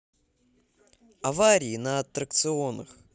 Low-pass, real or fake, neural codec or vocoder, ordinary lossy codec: none; real; none; none